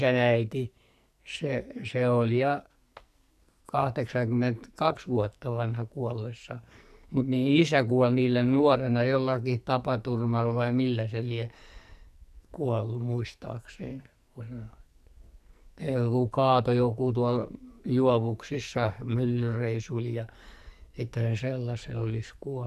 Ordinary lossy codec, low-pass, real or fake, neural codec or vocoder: none; 14.4 kHz; fake; codec, 44.1 kHz, 2.6 kbps, SNAC